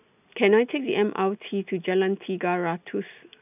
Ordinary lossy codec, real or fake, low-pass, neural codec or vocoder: none; real; 3.6 kHz; none